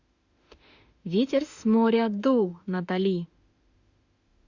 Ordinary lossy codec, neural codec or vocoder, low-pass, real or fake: Opus, 64 kbps; autoencoder, 48 kHz, 32 numbers a frame, DAC-VAE, trained on Japanese speech; 7.2 kHz; fake